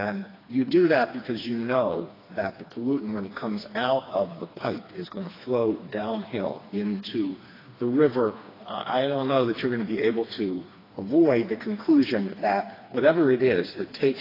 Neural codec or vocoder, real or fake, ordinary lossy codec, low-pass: codec, 16 kHz, 2 kbps, FreqCodec, smaller model; fake; AAC, 24 kbps; 5.4 kHz